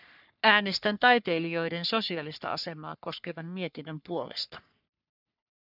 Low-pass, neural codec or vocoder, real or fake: 5.4 kHz; codec, 44.1 kHz, 3.4 kbps, Pupu-Codec; fake